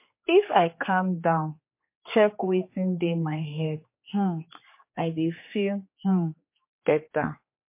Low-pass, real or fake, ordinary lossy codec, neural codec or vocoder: 3.6 kHz; fake; MP3, 24 kbps; codec, 16 kHz, 4 kbps, X-Codec, HuBERT features, trained on general audio